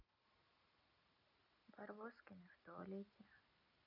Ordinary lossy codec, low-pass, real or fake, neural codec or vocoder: none; 5.4 kHz; real; none